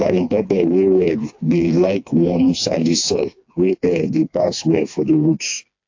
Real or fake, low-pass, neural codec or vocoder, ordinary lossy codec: fake; 7.2 kHz; codec, 16 kHz, 2 kbps, FreqCodec, smaller model; AAC, 48 kbps